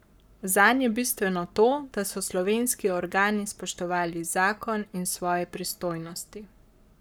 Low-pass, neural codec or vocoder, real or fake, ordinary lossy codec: none; codec, 44.1 kHz, 7.8 kbps, Pupu-Codec; fake; none